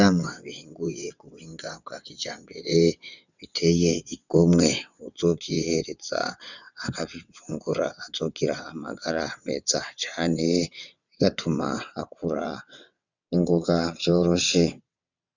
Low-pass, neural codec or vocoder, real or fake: 7.2 kHz; vocoder, 22.05 kHz, 80 mel bands, WaveNeXt; fake